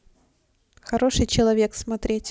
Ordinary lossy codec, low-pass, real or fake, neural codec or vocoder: none; none; real; none